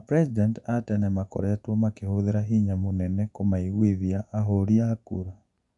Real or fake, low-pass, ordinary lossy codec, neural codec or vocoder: fake; 10.8 kHz; none; vocoder, 24 kHz, 100 mel bands, Vocos